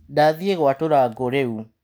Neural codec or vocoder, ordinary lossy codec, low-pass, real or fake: none; none; none; real